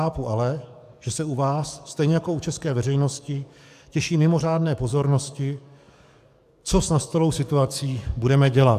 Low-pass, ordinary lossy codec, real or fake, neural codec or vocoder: 14.4 kHz; AAC, 96 kbps; fake; codec, 44.1 kHz, 7.8 kbps, DAC